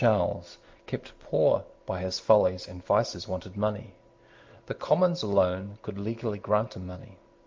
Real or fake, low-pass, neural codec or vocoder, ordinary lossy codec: real; 7.2 kHz; none; Opus, 24 kbps